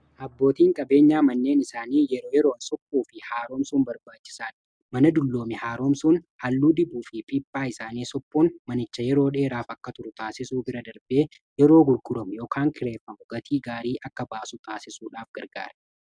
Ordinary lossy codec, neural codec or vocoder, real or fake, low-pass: MP3, 96 kbps; none; real; 9.9 kHz